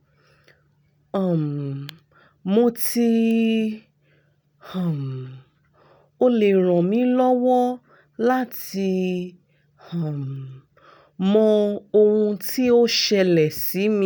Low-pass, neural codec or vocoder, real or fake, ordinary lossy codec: none; none; real; none